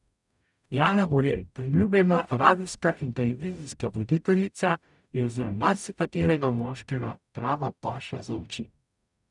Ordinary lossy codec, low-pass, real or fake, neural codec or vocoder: none; 10.8 kHz; fake; codec, 44.1 kHz, 0.9 kbps, DAC